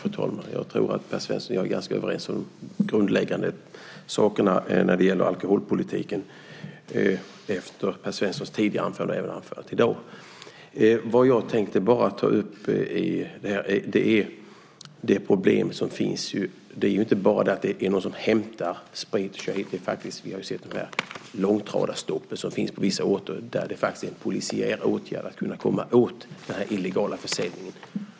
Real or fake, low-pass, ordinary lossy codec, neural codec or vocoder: real; none; none; none